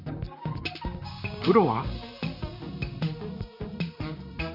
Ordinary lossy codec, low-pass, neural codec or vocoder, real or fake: none; 5.4 kHz; vocoder, 44.1 kHz, 128 mel bands, Pupu-Vocoder; fake